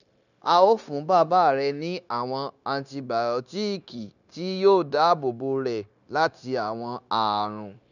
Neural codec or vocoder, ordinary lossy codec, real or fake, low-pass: codec, 16 kHz, 0.9 kbps, LongCat-Audio-Codec; none; fake; 7.2 kHz